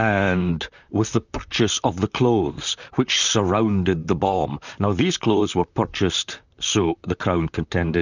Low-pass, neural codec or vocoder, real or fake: 7.2 kHz; vocoder, 44.1 kHz, 128 mel bands, Pupu-Vocoder; fake